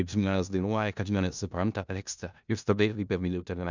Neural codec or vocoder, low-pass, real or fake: codec, 16 kHz in and 24 kHz out, 0.4 kbps, LongCat-Audio-Codec, four codebook decoder; 7.2 kHz; fake